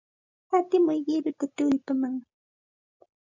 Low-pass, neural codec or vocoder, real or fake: 7.2 kHz; none; real